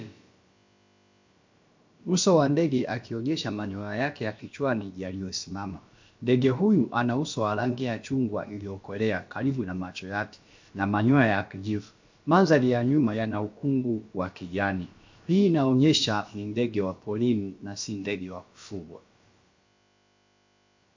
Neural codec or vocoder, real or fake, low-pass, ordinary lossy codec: codec, 16 kHz, about 1 kbps, DyCAST, with the encoder's durations; fake; 7.2 kHz; MP3, 48 kbps